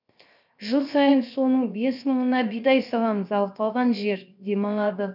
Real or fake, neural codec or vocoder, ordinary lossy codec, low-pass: fake; codec, 16 kHz, 0.7 kbps, FocalCodec; none; 5.4 kHz